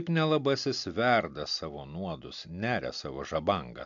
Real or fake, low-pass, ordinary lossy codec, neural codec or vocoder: real; 7.2 kHz; AAC, 64 kbps; none